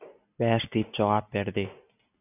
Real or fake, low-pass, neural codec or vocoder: real; 3.6 kHz; none